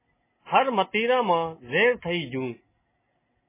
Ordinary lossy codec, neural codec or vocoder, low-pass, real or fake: MP3, 16 kbps; none; 3.6 kHz; real